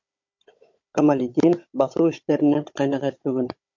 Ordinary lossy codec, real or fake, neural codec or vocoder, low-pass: MP3, 48 kbps; fake; codec, 16 kHz, 16 kbps, FunCodec, trained on Chinese and English, 50 frames a second; 7.2 kHz